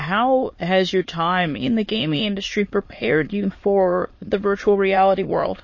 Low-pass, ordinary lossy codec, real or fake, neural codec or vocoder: 7.2 kHz; MP3, 32 kbps; fake; autoencoder, 22.05 kHz, a latent of 192 numbers a frame, VITS, trained on many speakers